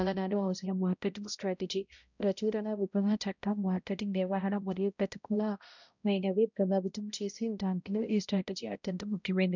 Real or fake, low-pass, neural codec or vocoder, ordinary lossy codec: fake; 7.2 kHz; codec, 16 kHz, 0.5 kbps, X-Codec, HuBERT features, trained on balanced general audio; none